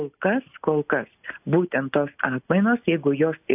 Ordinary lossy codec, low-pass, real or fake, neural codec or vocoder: AAC, 32 kbps; 3.6 kHz; real; none